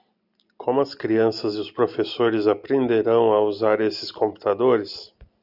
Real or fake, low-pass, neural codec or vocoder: real; 5.4 kHz; none